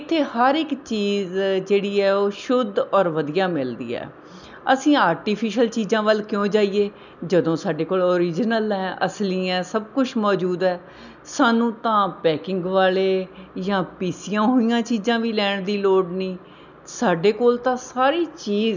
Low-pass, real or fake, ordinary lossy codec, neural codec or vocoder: 7.2 kHz; real; none; none